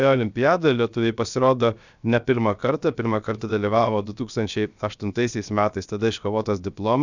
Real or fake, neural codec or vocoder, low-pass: fake; codec, 16 kHz, 0.7 kbps, FocalCodec; 7.2 kHz